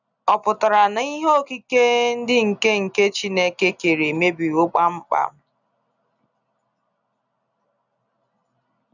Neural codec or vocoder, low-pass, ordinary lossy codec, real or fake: none; 7.2 kHz; none; real